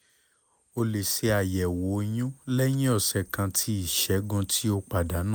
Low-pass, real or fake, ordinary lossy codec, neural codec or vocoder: none; real; none; none